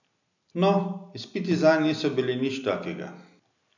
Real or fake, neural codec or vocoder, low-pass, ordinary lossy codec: real; none; 7.2 kHz; none